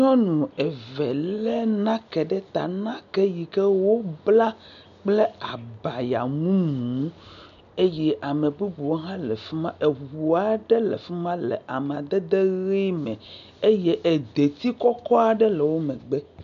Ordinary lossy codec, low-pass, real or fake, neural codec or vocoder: MP3, 64 kbps; 7.2 kHz; real; none